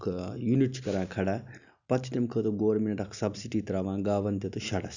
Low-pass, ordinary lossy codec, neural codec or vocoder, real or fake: 7.2 kHz; none; none; real